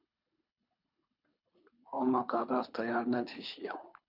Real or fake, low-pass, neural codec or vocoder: fake; 5.4 kHz; codec, 24 kHz, 3 kbps, HILCodec